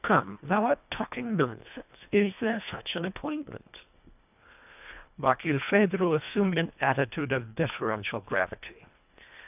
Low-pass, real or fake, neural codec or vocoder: 3.6 kHz; fake; codec, 24 kHz, 1.5 kbps, HILCodec